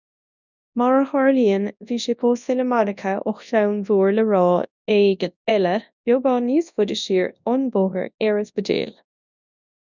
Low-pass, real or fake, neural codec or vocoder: 7.2 kHz; fake; codec, 24 kHz, 0.9 kbps, WavTokenizer, large speech release